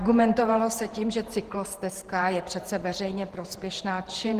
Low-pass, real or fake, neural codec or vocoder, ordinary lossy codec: 14.4 kHz; fake; vocoder, 48 kHz, 128 mel bands, Vocos; Opus, 16 kbps